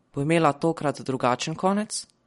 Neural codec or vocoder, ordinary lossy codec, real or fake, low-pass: vocoder, 44.1 kHz, 128 mel bands every 512 samples, BigVGAN v2; MP3, 48 kbps; fake; 19.8 kHz